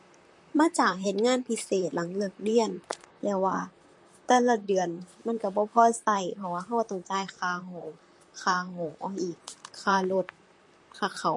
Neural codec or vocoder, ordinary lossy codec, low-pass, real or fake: vocoder, 44.1 kHz, 128 mel bands, Pupu-Vocoder; MP3, 48 kbps; 10.8 kHz; fake